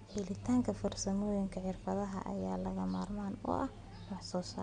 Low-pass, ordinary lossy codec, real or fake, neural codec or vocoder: 9.9 kHz; MP3, 64 kbps; real; none